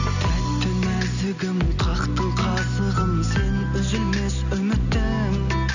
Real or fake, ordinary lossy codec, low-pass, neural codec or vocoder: real; none; 7.2 kHz; none